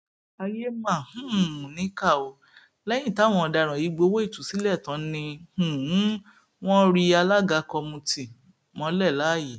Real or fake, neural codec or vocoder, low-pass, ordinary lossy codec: real; none; none; none